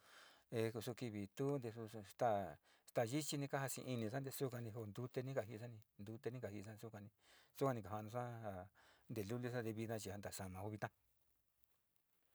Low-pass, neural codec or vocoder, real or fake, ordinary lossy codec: none; none; real; none